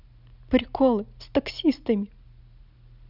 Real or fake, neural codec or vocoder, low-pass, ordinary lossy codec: real; none; 5.4 kHz; none